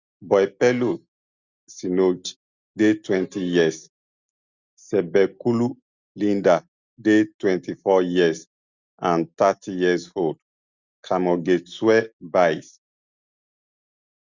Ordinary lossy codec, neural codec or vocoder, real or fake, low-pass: Opus, 64 kbps; none; real; 7.2 kHz